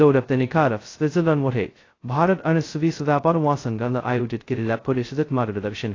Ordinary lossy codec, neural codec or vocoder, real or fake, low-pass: AAC, 32 kbps; codec, 16 kHz, 0.2 kbps, FocalCodec; fake; 7.2 kHz